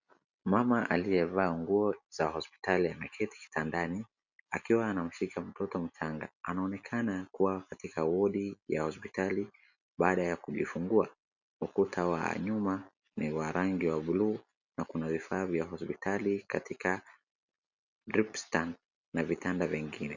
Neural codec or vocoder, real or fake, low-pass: none; real; 7.2 kHz